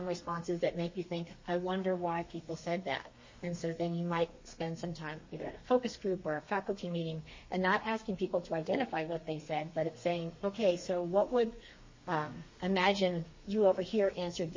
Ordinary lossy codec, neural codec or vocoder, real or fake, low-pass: MP3, 32 kbps; codec, 32 kHz, 1.9 kbps, SNAC; fake; 7.2 kHz